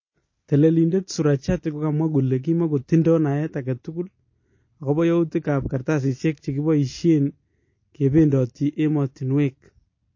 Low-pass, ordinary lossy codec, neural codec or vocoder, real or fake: 7.2 kHz; MP3, 32 kbps; none; real